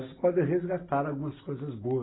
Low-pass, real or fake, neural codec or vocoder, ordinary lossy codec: 7.2 kHz; real; none; AAC, 16 kbps